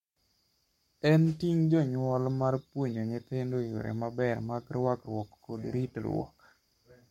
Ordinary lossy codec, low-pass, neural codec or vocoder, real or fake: MP3, 64 kbps; 19.8 kHz; codec, 44.1 kHz, 7.8 kbps, Pupu-Codec; fake